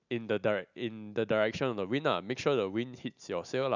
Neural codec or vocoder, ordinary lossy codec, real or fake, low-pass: none; none; real; 7.2 kHz